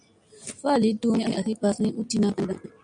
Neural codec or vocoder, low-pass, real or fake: none; 9.9 kHz; real